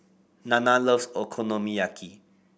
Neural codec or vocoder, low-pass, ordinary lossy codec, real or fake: none; none; none; real